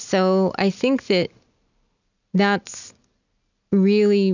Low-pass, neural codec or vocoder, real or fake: 7.2 kHz; none; real